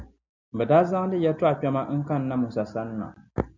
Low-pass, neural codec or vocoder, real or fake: 7.2 kHz; none; real